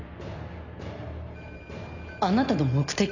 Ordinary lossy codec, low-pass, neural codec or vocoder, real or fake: none; 7.2 kHz; none; real